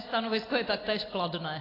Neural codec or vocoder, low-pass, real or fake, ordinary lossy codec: none; 5.4 kHz; real; AAC, 24 kbps